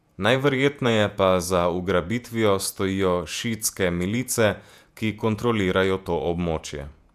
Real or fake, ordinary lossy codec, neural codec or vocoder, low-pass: fake; none; vocoder, 48 kHz, 128 mel bands, Vocos; 14.4 kHz